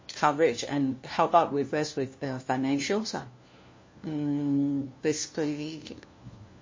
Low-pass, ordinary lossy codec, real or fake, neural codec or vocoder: 7.2 kHz; MP3, 32 kbps; fake; codec, 16 kHz, 1 kbps, FunCodec, trained on LibriTTS, 50 frames a second